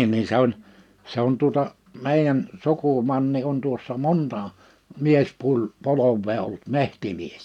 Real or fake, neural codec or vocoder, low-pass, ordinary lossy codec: real; none; 19.8 kHz; none